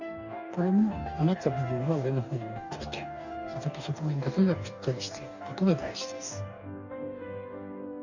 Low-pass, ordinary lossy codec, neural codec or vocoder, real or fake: 7.2 kHz; none; codec, 44.1 kHz, 2.6 kbps, DAC; fake